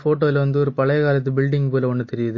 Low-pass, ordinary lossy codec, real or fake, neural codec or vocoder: 7.2 kHz; MP3, 32 kbps; real; none